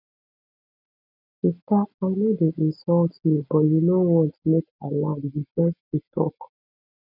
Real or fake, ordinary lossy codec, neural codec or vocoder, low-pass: real; none; none; 5.4 kHz